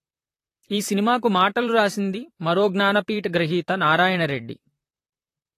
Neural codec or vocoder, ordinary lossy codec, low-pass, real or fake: vocoder, 44.1 kHz, 128 mel bands, Pupu-Vocoder; AAC, 48 kbps; 14.4 kHz; fake